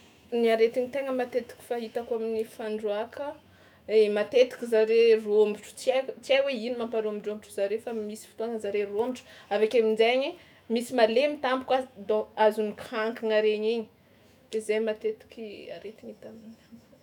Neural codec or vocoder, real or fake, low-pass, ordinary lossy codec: autoencoder, 48 kHz, 128 numbers a frame, DAC-VAE, trained on Japanese speech; fake; 19.8 kHz; none